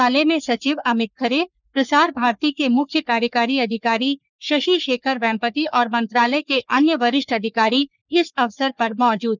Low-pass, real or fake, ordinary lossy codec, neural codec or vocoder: 7.2 kHz; fake; none; codec, 44.1 kHz, 3.4 kbps, Pupu-Codec